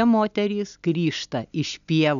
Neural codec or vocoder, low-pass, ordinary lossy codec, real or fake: none; 7.2 kHz; MP3, 96 kbps; real